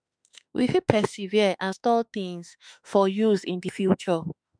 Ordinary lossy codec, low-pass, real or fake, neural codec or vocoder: none; 9.9 kHz; fake; autoencoder, 48 kHz, 32 numbers a frame, DAC-VAE, trained on Japanese speech